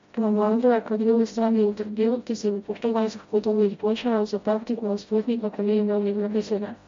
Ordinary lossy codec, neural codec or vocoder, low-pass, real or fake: MP3, 48 kbps; codec, 16 kHz, 0.5 kbps, FreqCodec, smaller model; 7.2 kHz; fake